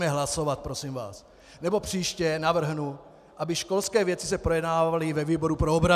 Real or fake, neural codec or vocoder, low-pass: real; none; 14.4 kHz